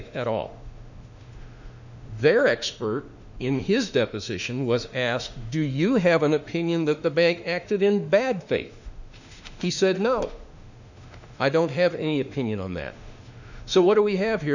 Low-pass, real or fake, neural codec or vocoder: 7.2 kHz; fake; autoencoder, 48 kHz, 32 numbers a frame, DAC-VAE, trained on Japanese speech